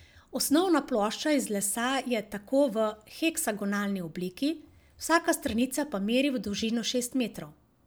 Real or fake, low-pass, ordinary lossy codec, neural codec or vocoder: real; none; none; none